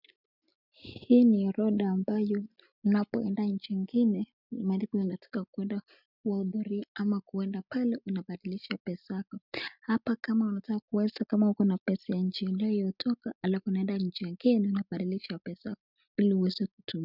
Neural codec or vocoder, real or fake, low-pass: none; real; 5.4 kHz